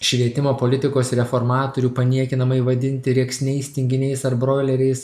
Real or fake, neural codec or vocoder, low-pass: real; none; 14.4 kHz